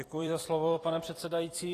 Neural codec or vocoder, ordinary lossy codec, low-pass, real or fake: vocoder, 44.1 kHz, 128 mel bands every 256 samples, BigVGAN v2; AAC, 48 kbps; 14.4 kHz; fake